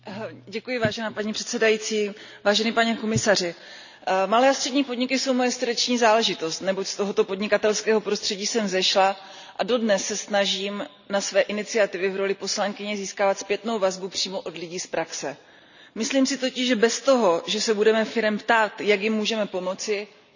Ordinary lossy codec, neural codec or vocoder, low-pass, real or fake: none; none; 7.2 kHz; real